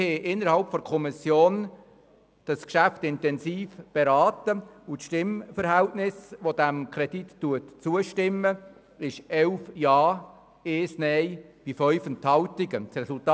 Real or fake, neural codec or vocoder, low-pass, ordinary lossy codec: real; none; none; none